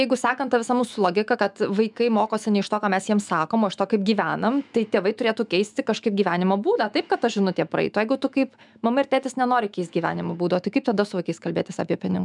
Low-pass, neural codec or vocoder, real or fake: 10.8 kHz; autoencoder, 48 kHz, 128 numbers a frame, DAC-VAE, trained on Japanese speech; fake